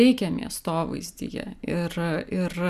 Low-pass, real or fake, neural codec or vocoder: 14.4 kHz; real; none